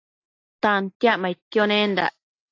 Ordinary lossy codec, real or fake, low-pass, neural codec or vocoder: AAC, 32 kbps; real; 7.2 kHz; none